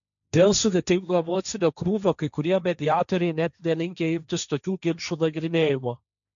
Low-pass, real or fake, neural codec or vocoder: 7.2 kHz; fake; codec, 16 kHz, 1.1 kbps, Voila-Tokenizer